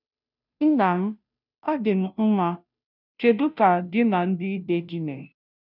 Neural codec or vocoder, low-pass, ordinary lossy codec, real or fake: codec, 16 kHz, 0.5 kbps, FunCodec, trained on Chinese and English, 25 frames a second; 5.4 kHz; none; fake